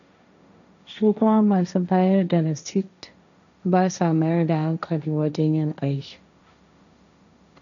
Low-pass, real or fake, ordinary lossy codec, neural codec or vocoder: 7.2 kHz; fake; none; codec, 16 kHz, 1.1 kbps, Voila-Tokenizer